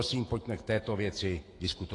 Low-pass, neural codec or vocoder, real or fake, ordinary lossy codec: 10.8 kHz; autoencoder, 48 kHz, 128 numbers a frame, DAC-VAE, trained on Japanese speech; fake; AAC, 32 kbps